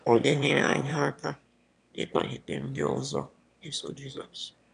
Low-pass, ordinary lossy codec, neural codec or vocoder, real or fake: 9.9 kHz; none; autoencoder, 22.05 kHz, a latent of 192 numbers a frame, VITS, trained on one speaker; fake